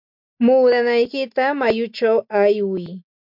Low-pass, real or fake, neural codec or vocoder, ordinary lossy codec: 5.4 kHz; real; none; MP3, 48 kbps